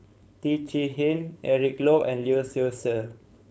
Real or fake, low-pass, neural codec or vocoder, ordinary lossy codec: fake; none; codec, 16 kHz, 4.8 kbps, FACodec; none